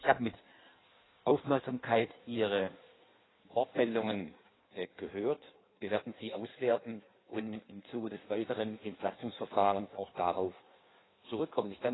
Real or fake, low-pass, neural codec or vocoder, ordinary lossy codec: fake; 7.2 kHz; codec, 16 kHz in and 24 kHz out, 1.1 kbps, FireRedTTS-2 codec; AAC, 16 kbps